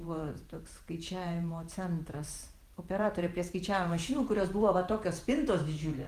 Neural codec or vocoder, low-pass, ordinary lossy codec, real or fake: vocoder, 48 kHz, 128 mel bands, Vocos; 14.4 kHz; Opus, 24 kbps; fake